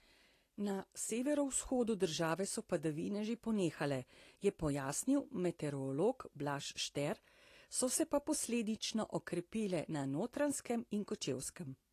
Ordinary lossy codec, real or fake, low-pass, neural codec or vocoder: AAC, 48 kbps; real; 14.4 kHz; none